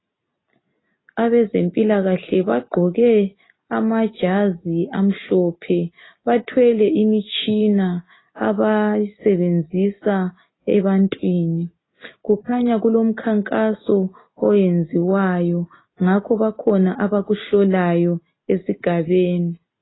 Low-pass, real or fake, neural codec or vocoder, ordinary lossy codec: 7.2 kHz; real; none; AAC, 16 kbps